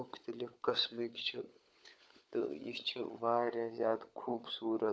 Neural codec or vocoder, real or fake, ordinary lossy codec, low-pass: codec, 16 kHz, 16 kbps, FunCodec, trained on LibriTTS, 50 frames a second; fake; none; none